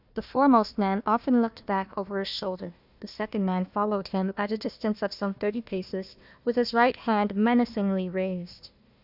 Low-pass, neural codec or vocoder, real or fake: 5.4 kHz; codec, 16 kHz, 1 kbps, FunCodec, trained on Chinese and English, 50 frames a second; fake